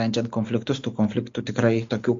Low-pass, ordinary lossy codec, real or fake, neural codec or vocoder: 7.2 kHz; MP3, 48 kbps; real; none